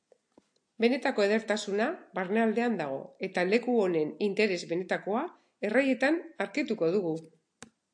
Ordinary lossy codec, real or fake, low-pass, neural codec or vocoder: AAC, 64 kbps; real; 9.9 kHz; none